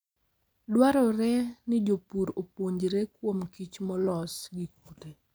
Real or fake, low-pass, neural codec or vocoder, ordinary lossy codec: real; none; none; none